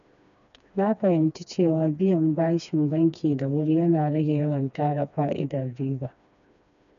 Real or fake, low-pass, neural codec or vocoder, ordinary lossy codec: fake; 7.2 kHz; codec, 16 kHz, 2 kbps, FreqCodec, smaller model; none